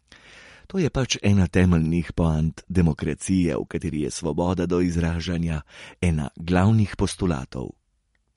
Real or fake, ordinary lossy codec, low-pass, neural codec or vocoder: real; MP3, 48 kbps; 19.8 kHz; none